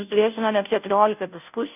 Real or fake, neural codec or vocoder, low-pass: fake; codec, 16 kHz, 0.5 kbps, FunCodec, trained on Chinese and English, 25 frames a second; 3.6 kHz